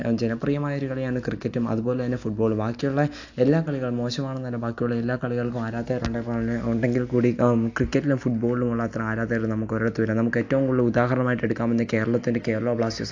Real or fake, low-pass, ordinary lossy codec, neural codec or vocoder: real; 7.2 kHz; AAC, 48 kbps; none